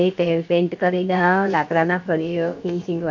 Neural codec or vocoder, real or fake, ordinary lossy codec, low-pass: codec, 16 kHz, about 1 kbps, DyCAST, with the encoder's durations; fake; none; 7.2 kHz